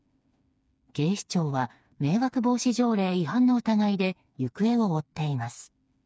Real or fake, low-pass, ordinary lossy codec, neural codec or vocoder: fake; none; none; codec, 16 kHz, 4 kbps, FreqCodec, smaller model